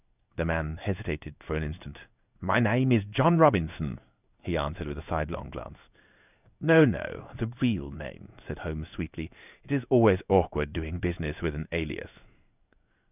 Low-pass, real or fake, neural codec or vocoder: 3.6 kHz; fake; codec, 16 kHz in and 24 kHz out, 1 kbps, XY-Tokenizer